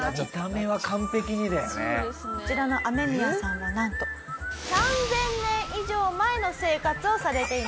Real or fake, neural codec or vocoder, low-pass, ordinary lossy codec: real; none; none; none